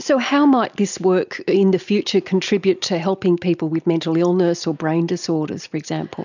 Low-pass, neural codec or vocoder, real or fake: 7.2 kHz; none; real